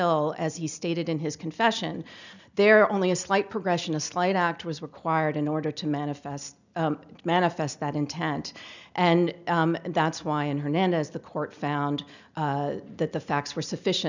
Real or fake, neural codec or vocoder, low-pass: real; none; 7.2 kHz